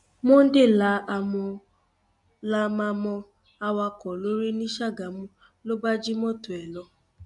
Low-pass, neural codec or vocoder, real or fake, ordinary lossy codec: 10.8 kHz; none; real; none